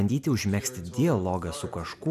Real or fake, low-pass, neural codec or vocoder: real; 14.4 kHz; none